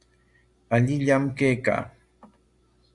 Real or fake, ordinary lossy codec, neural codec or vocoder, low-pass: real; Opus, 64 kbps; none; 10.8 kHz